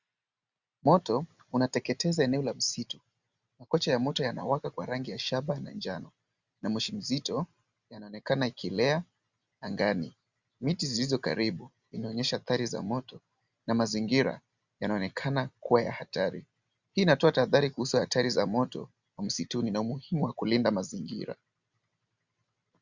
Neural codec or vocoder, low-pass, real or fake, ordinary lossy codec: none; 7.2 kHz; real; Opus, 64 kbps